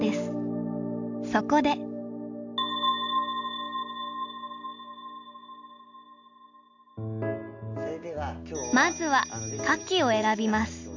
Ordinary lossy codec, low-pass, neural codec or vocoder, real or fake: none; 7.2 kHz; none; real